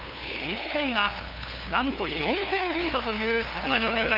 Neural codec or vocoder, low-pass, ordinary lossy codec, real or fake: codec, 16 kHz, 2 kbps, FunCodec, trained on LibriTTS, 25 frames a second; 5.4 kHz; none; fake